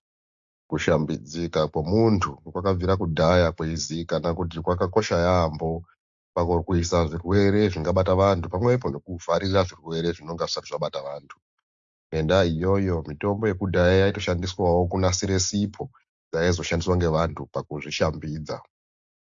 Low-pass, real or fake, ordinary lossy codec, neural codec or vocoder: 7.2 kHz; real; AAC, 64 kbps; none